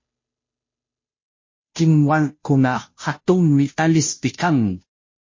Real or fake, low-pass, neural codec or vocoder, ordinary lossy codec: fake; 7.2 kHz; codec, 16 kHz, 0.5 kbps, FunCodec, trained on Chinese and English, 25 frames a second; MP3, 32 kbps